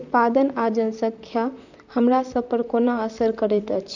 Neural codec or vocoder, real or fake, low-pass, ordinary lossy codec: vocoder, 44.1 kHz, 128 mel bands, Pupu-Vocoder; fake; 7.2 kHz; none